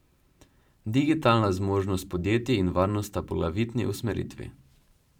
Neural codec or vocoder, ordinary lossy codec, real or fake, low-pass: vocoder, 44.1 kHz, 128 mel bands every 512 samples, BigVGAN v2; none; fake; 19.8 kHz